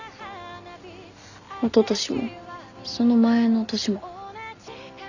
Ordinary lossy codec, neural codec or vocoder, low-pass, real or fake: none; none; 7.2 kHz; real